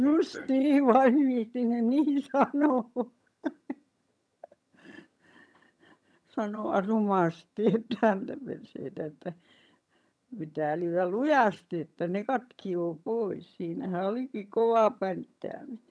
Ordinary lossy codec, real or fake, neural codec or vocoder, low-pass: none; fake; vocoder, 22.05 kHz, 80 mel bands, HiFi-GAN; none